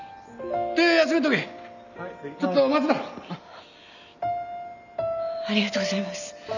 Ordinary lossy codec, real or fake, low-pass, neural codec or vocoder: none; real; 7.2 kHz; none